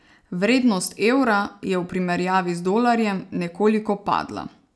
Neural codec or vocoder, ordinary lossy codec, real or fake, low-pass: none; none; real; none